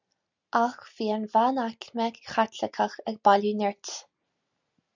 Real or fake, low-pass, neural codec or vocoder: real; 7.2 kHz; none